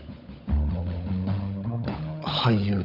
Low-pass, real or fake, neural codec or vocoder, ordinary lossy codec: 5.4 kHz; fake; codec, 16 kHz, 16 kbps, FunCodec, trained on LibriTTS, 50 frames a second; none